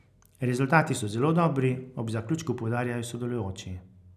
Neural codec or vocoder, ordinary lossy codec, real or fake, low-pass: none; none; real; 14.4 kHz